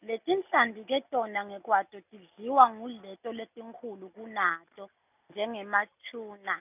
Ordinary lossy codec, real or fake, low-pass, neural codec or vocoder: none; real; 3.6 kHz; none